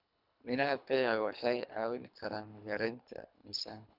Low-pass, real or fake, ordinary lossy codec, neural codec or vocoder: 5.4 kHz; fake; none; codec, 24 kHz, 3 kbps, HILCodec